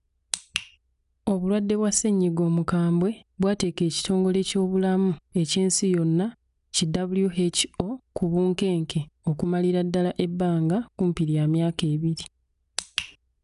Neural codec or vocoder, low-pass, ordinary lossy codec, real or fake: none; 10.8 kHz; none; real